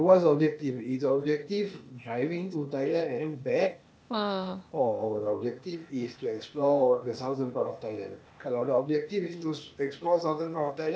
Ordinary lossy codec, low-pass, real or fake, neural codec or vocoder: none; none; fake; codec, 16 kHz, 0.8 kbps, ZipCodec